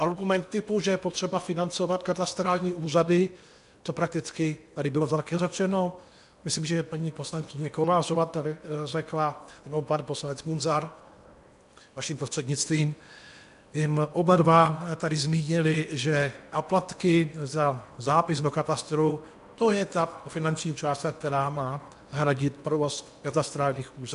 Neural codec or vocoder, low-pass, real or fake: codec, 16 kHz in and 24 kHz out, 0.8 kbps, FocalCodec, streaming, 65536 codes; 10.8 kHz; fake